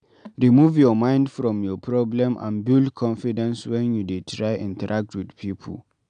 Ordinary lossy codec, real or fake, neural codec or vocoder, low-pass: none; real; none; 9.9 kHz